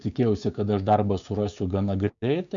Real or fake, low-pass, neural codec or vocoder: fake; 7.2 kHz; codec, 16 kHz, 6 kbps, DAC